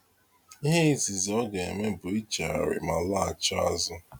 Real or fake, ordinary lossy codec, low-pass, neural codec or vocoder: real; none; none; none